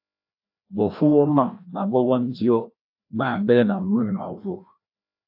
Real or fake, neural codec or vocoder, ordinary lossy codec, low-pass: fake; codec, 16 kHz, 1 kbps, FreqCodec, larger model; AAC, 48 kbps; 5.4 kHz